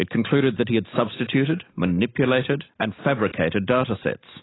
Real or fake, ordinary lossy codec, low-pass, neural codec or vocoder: real; AAC, 16 kbps; 7.2 kHz; none